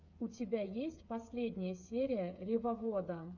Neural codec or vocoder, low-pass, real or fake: codec, 16 kHz, 8 kbps, FreqCodec, smaller model; 7.2 kHz; fake